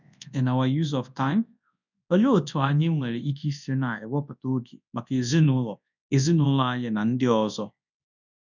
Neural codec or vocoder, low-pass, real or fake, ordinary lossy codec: codec, 24 kHz, 0.9 kbps, WavTokenizer, large speech release; 7.2 kHz; fake; none